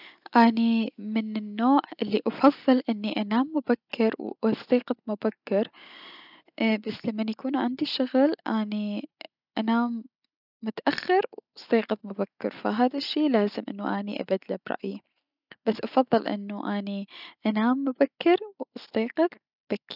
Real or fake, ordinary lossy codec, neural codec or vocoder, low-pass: real; none; none; 5.4 kHz